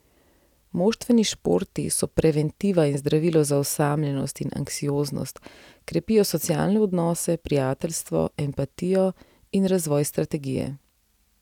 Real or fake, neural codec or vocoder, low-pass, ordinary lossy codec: real; none; 19.8 kHz; none